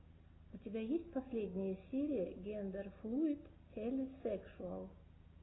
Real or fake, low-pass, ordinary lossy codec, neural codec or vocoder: real; 7.2 kHz; AAC, 16 kbps; none